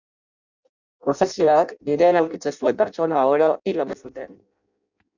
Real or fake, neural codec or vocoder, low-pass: fake; codec, 16 kHz in and 24 kHz out, 0.6 kbps, FireRedTTS-2 codec; 7.2 kHz